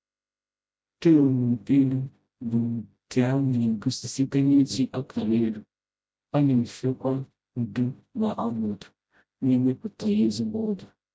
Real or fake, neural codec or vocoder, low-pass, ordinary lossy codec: fake; codec, 16 kHz, 0.5 kbps, FreqCodec, smaller model; none; none